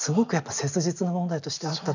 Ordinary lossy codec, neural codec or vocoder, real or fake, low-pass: none; none; real; 7.2 kHz